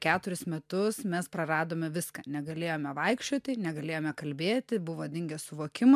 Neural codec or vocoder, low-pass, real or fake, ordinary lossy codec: none; 14.4 kHz; real; MP3, 96 kbps